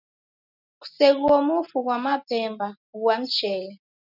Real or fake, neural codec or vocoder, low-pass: real; none; 5.4 kHz